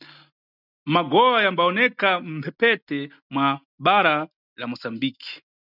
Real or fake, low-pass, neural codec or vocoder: real; 5.4 kHz; none